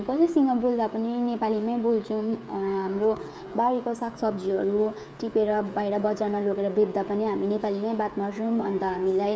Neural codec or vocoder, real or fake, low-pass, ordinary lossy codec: codec, 16 kHz, 16 kbps, FreqCodec, smaller model; fake; none; none